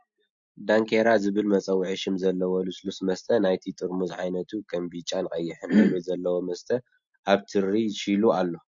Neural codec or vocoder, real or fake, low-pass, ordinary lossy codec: none; real; 7.2 kHz; MP3, 48 kbps